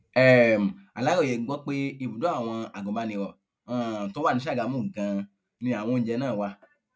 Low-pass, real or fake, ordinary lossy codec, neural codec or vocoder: none; real; none; none